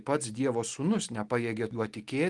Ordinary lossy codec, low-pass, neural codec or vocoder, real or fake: Opus, 24 kbps; 10.8 kHz; none; real